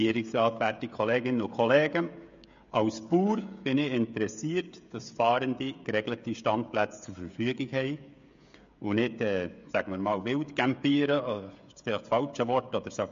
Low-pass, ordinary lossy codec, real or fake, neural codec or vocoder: 7.2 kHz; MP3, 48 kbps; fake; codec, 16 kHz, 16 kbps, FreqCodec, smaller model